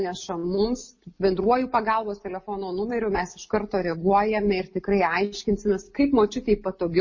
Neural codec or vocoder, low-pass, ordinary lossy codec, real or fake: none; 7.2 kHz; MP3, 32 kbps; real